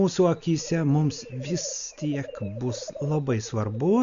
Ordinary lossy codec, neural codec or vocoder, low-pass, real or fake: Opus, 64 kbps; none; 7.2 kHz; real